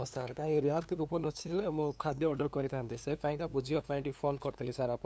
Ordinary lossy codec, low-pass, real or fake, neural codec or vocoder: none; none; fake; codec, 16 kHz, 2 kbps, FunCodec, trained on LibriTTS, 25 frames a second